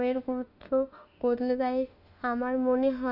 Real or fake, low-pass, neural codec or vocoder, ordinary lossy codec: fake; 5.4 kHz; autoencoder, 48 kHz, 32 numbers a frame, DAC-VAE, trained on Japanese speech; none